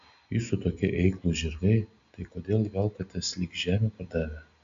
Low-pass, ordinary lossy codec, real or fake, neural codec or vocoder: 7.2 kHz; AAC, 64 kbps; real; none